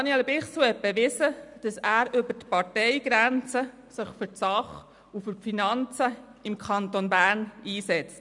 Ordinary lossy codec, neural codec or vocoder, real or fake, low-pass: none; none; real; 10.8 kHz